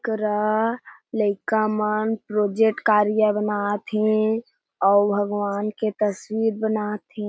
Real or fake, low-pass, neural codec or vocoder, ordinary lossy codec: real; none; none; none